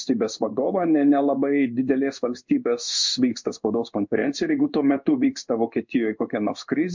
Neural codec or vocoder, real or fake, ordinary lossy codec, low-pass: codec, 16 kHz in and 24 kHz out, 1 kbps, XY-Tokenizer; fake; MP3, 64 kbps; 7.2 kHz